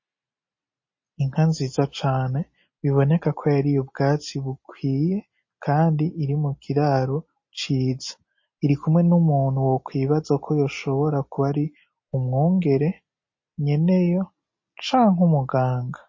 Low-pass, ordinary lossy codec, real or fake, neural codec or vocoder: 7.2 kHz; MP3, 32 kbps; real; none